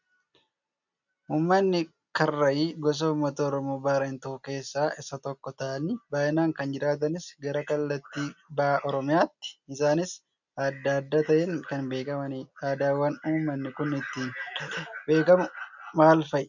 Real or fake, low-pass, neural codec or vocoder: real; 7.2 kHz; none